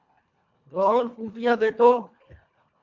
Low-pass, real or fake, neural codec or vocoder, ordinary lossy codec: 7.2 kHz; fake; codec, 24 kHz, 1.5 kbps, HILCodec; MP3, 64 kbps